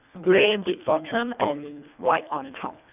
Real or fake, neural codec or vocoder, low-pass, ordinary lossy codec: fake; codec, 24 kHz, 1.5 kbps, HILCodec; 3.6 kHz; none